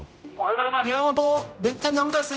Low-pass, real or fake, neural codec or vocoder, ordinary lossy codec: none; fake; codec, 16 kHz, 0.5 kbps, X-Codec, HuBERT features, trained on general audio; none